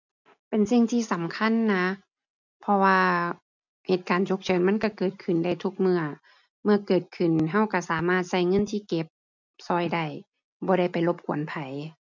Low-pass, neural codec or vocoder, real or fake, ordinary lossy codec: 7.2 kHz; none; real; none